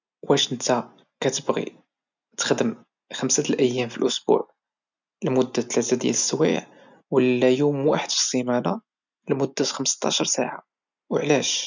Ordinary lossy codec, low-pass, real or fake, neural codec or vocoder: none; 7.2 kHz; real; none